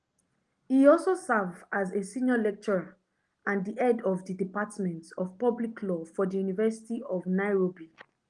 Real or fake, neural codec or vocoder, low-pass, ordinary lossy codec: real; none; 10.8 kHz; Opus, 24 kbps